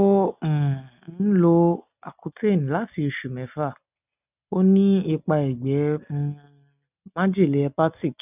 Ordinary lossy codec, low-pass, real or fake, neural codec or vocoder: none; 3.6 kHz; real; none